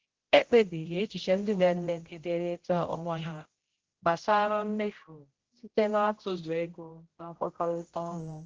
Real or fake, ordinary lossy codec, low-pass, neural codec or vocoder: fake; Opus, 16 kbps; 7.2 kHz; codec, 16 kHz, 0.5 kbps, X-Codec, HuBERT features, trained on general audio